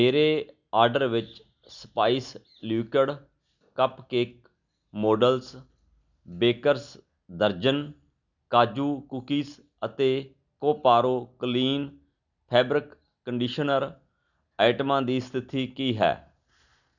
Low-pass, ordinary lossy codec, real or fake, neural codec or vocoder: 7.2 kHz; none; real; none